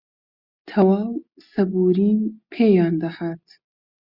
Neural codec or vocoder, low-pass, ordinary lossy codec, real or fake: none; 5.4 kHz; Opus, 64 kbps; real